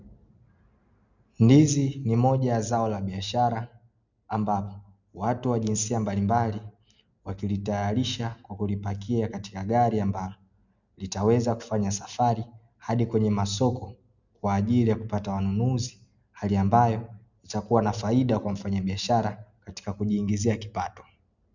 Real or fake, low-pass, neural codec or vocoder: real; 7.2 kHz; none